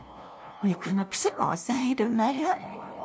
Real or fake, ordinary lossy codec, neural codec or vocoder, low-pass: fake; none; codec, 16 kHz, 0.5 kbps, FunCodec, trained on LibriTTS, 25 frames a second; none